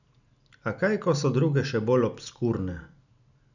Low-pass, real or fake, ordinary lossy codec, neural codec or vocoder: 7.2 kHz; real; none; none